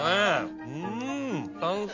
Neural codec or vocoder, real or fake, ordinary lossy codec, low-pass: none; real; MP3, 64 kbps; 7.2 kHz